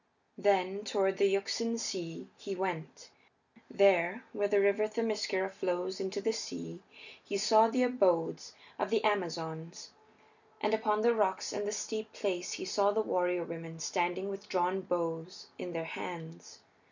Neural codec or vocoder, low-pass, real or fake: none; 7.2 kHz; real